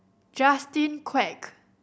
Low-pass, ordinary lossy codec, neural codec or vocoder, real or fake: none; none; none; real